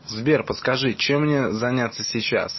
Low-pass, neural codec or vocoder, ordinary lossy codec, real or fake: 7.2 kHz; none; MP3, 24 kbps; real